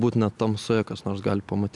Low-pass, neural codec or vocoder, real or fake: 10.8 kHz; none; real